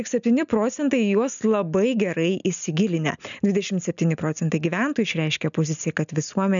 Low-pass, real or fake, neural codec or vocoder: 7.2 kHz; real; none